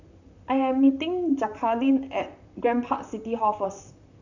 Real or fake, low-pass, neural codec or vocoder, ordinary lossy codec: fake; 7.2 kHz; vocoder, 44.1 kHz, 128 mel bands, Pupu-Vocoder; none